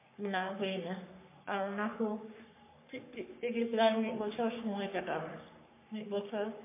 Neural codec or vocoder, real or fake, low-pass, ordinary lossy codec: codec, 44.1 kHz, 3.4 kbps, Pupu-Codec; fake; 3.6 kHz; MP3, 24 kbps